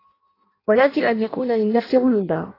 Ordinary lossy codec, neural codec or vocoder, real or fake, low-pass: AAC, 24 kbps; codec, 16 kHz in and 24 kHz out, 0.6 kbps, FireRedTTS-2 codec; fake; 5.4 kHz